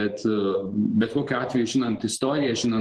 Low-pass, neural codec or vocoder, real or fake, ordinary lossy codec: 10.8 kHz; vocoder, 24 kHz, 100 mel bands, Vocos; fake; Opus, 16 kbps